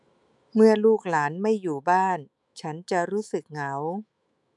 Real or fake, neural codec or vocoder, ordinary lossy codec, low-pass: fake; autoencoder, 48 kHz, 128 numbers a frame, DAC-VAE, trained on Japanese speech; none; 10.8 kHz